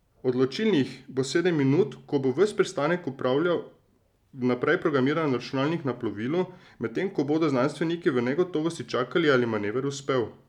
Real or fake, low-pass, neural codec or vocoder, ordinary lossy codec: real; 19.8 kHz; none; none